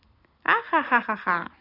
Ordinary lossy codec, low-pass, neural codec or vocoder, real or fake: AAC, 24 kbps; 5.4 kHz; none; real